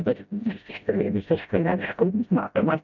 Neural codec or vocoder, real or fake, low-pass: codec, 16 kHz, 0.5 kbps, FreqCodec, smaller model; fake; 7.2 kHz